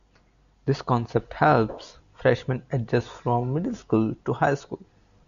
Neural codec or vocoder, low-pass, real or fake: none; 7.2 kHz; real